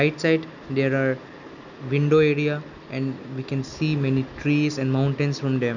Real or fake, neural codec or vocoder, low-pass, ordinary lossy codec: real; none; 7.2 kHz; none